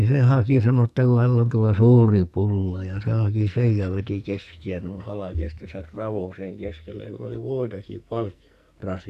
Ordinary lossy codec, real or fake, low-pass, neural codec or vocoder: none; fake; 14.4 kHz; codec, 44.1 kHz, 2.6 kbps, SNAC